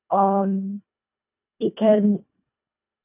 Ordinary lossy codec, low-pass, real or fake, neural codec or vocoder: none; 3.6 kHz; fake; codec, 24 kHz, 1.5 kbps, HILCodec